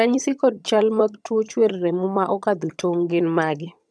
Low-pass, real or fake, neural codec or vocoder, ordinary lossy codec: none; fake; vocoder, 22.05 kHz, 80 mel bands, HiFi-GAN; none